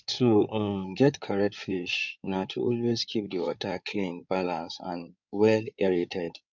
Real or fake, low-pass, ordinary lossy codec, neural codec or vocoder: fake; 7.2 kHz; none; codec, 16 kHz in and 24 kHz out, 2.2 kbps, FireRedTTS-2 codec